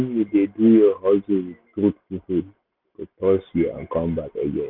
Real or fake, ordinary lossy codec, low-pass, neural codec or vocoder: real; none; 5.4 kHz; none